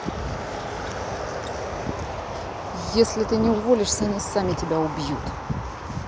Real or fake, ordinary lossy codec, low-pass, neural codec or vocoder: real; none; none; none